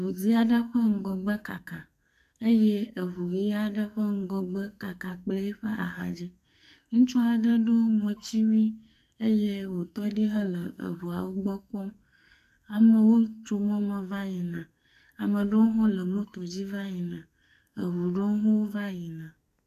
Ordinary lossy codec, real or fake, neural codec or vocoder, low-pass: MP3, 96 kbps; fake; codec, 44.1 kHz, 2.6 kbps, SNAC; 14.4 kHz